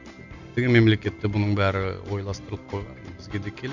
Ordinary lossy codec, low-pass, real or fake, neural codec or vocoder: none; 7.2 kHz; real; none